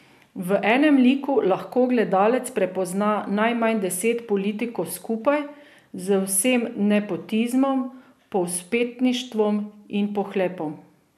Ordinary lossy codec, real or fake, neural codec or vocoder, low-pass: none; real; none; 14.4 kHz